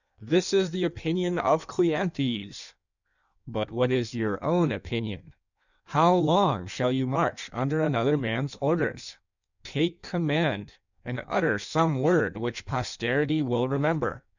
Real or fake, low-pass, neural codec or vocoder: fake; 7.2 kHz; codec, 16 kHz in and 24 kHz out, 1.1 kbps, FireRedTTS-2 codec